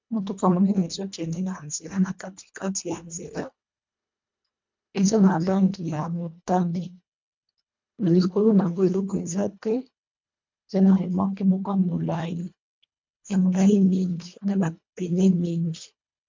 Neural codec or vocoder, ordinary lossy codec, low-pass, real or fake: codec, 24 kHz, 1.5 kbps, HILCodec; MP3, 64 kbps; 7.2 kHz; fake